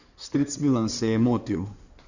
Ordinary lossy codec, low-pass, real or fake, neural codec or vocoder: none; 7.2 kHz; fake; codec, 16 kHz in and 24 kHz out, 2.2 kbps, FireRedTTS-2 codec